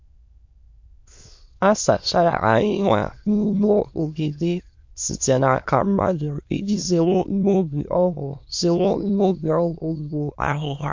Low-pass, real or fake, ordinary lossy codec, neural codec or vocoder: 7.2 kHz; fake; MP3, 48 kbps; autoencoder, 22.05 kHz, a latent of 192 numbers a frame, VITS, trained on many speakers